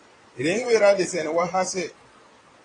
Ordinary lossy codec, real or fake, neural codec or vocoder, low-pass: AAC, 32 kbps; fake; vocoder, 22.05 kHz, 80 mel bands, Vocos; 9.9 kHz